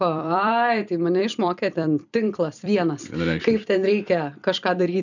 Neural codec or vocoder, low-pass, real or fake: vocoder, 44.1 kHz, 128 mel bands every 256 samples, BigVGAN v2; 7.2 kHz; fake